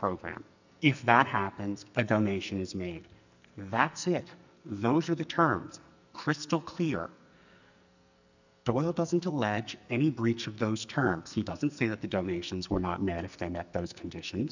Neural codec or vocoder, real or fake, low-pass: codec, 44.1 kHz, 2.6 kbps, SNAC; fake; 7.2 kHz